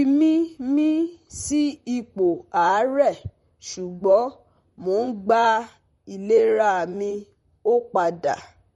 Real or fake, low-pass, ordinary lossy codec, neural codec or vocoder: fake; 19.8 kHz; MP3, 48 kbps; vocoder, 44.1 kHz, 128 mel bands, Pupu-Vocoder